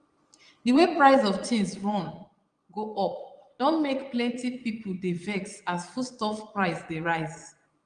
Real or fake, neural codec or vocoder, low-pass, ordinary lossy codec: fake; vocoder, 22.05 kHz, 80 mel bands, Vocos; 9.9 kHz; Opus, 32 kbps